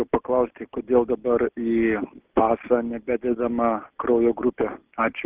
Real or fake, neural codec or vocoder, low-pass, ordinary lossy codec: real; none; 3.6 kHz; Opus, 32 kbps